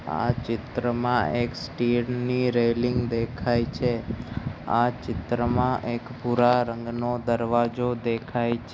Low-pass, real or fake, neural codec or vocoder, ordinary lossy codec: none; real; none; none